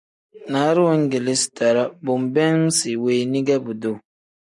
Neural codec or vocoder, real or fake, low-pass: none; real; 10.8 kHz